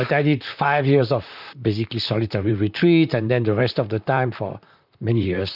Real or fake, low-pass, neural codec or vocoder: fake; 5.4 kHz; vocoder, 44.1 kHz, 128 mel bands, Pupu-Vocoder